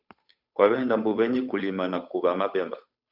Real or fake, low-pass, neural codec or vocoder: fake; 5.4 kHz; codec, 16 kHz, 8 kbps, FunCodec, trained on Chinese and English, 25 frames a second